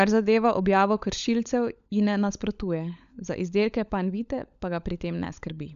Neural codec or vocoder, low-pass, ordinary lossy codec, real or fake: codec, 16 kHz, 16 kbps, FunCodec, trained on LibriTTS, 50 frames a second; 7.2 kHz; none; fake